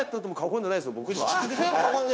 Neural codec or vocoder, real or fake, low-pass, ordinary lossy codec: codec, 16 kHz, 0.9 kbps, LongCat-Audio-Codec; fake; none; none